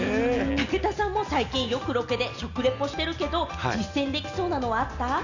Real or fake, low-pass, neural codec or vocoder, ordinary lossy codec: real; 7.2 kHz; none; none